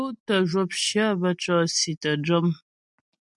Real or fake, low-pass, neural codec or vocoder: real; 10.8 kHz; none